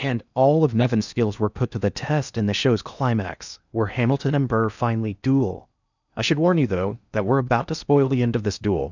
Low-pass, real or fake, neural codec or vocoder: 7.2 kHz; fake; codec, 16 kHz in and 24 kHz out, 0.6 kbps, FocalCodec, streaming, 2048 codes